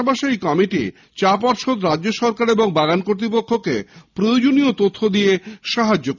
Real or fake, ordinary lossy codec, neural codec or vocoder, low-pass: real; none; none; 7.2 kHz